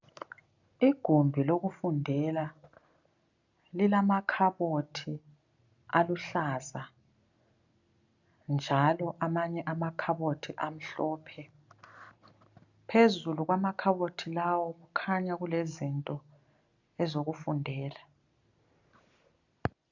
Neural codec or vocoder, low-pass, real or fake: none; 7.2 kHz; real